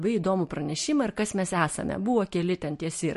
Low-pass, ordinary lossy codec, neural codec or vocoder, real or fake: 14.4 kHz; MP3, 48 kbps; none; real